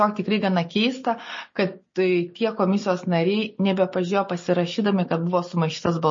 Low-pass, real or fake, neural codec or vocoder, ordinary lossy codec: 7.2 kHz; real; none; MP3, 32 kbps